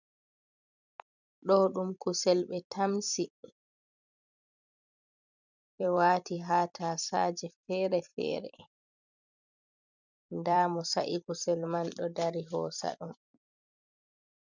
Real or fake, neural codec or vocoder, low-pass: fake; vocoder, 44.1 kHz, 128 mel bands every 256 samples, BigVGAN v2; 7.2 kHz